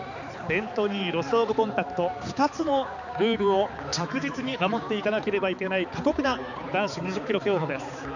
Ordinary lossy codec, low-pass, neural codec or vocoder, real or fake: none; 7.2 kHz; codec, 16 kHz, 4 kbps, X-Codec, HuBERT features, trained on balanced general audio; fake